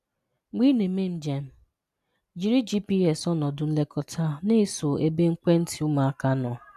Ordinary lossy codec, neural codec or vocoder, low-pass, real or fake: none; none; 14.4 kHz; real